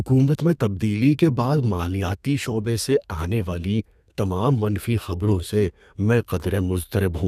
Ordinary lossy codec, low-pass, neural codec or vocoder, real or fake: none; 14.4 kHz; codec, 32 kHz, 1.9 kbps, SNAC; fake